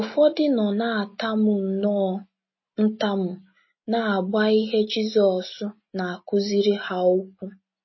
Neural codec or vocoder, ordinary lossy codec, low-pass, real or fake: none; MP3, 24 kbps; 7.2 kHz; real